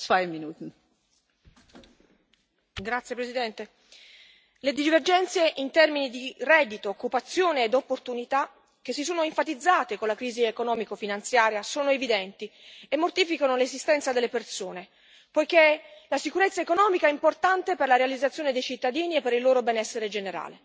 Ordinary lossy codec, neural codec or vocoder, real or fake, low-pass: none; none; real; none